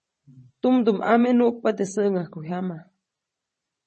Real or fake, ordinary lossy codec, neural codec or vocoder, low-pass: fake; MP3, 32 kbps; vocoder, 44.1 kHz, 128 mel bands every 512 samples, BigVGAN v2; 10.8 kHz